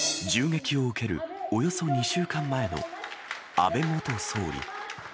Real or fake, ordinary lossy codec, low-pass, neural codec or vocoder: real; none; none; none